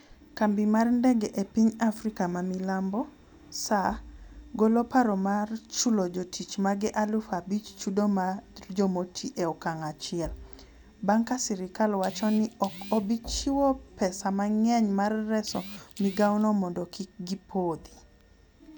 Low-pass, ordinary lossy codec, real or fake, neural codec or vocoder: 19.8 kHz; none; real; none